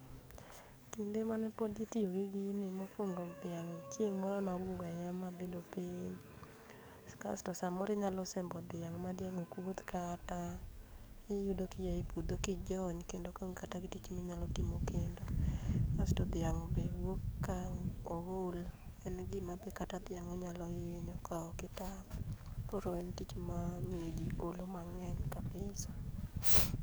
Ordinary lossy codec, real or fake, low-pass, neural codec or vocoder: none; fake; none; codec, 44.1 kHz, 7.8 kbps, DAC